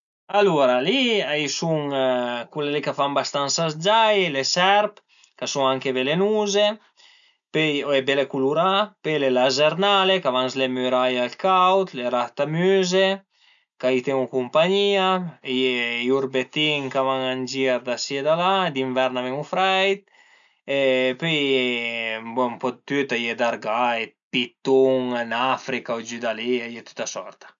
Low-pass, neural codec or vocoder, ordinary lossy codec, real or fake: 7.2 kHz; none; none; real